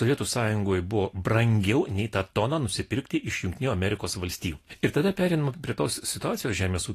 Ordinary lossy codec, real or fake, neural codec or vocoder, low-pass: AAC, 48 kbps; real; none; 14.4 kHz